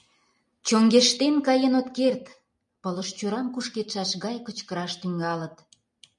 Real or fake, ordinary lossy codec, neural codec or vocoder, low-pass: real; MP3, 96 kbps; none; 10.8 kHz